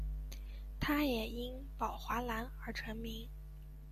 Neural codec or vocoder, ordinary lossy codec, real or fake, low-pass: none; AAC, 96 kbps; real; 14.4 kHz